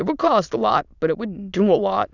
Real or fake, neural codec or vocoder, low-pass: fake; autoencoder, 22.05 kHz, a latent of 192 numbers a frame, VITS, trained on many speakers; 7.2 kHz